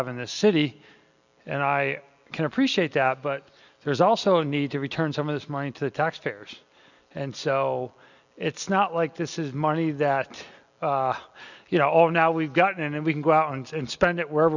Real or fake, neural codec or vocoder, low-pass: real; none; 7.2 kHz